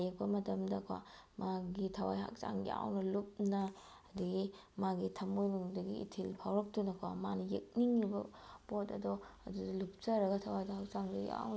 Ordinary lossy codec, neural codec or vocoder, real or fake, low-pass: none; none; real; none